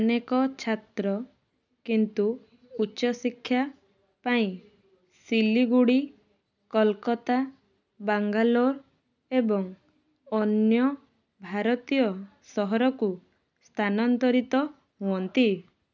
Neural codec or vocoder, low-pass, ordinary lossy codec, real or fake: none; 7.2 kHz; none; real